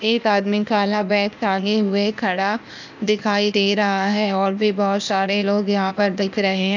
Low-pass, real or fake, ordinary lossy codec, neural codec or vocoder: 7.2 kHz; fake; none; codec, 16 kHz, 0.8 kbps, ZipCodec